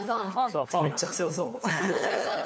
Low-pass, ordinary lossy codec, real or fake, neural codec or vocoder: none; none; fake; codec, 16 kHz, 4 kbps, FunCodec, trained on LibriTTS, 50 frames a second